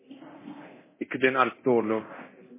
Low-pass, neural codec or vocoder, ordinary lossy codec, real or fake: 3.6 kHz; codec, 24 kHz, 0.9 kbps, DualCodec; MP3, 16 kbps; fake